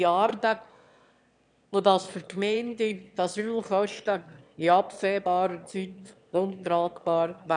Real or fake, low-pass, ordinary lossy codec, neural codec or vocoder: fake; 9.9 kHz; none; autoencoder, 22.05 kHz, a latent of 192 numbers a frame, VITS, trained on one speaker